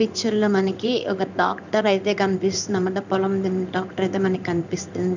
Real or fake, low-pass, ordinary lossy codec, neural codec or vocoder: fake; 7.2 kHz; none; codec, 16 kHz in and 24 kHz out, 1 kbps, XY-Tokenizer